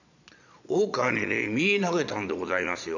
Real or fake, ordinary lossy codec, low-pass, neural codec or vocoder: fake; none; 7.2 kHz; vocoder, 22.05 kHz, 80 mel bands, Vocos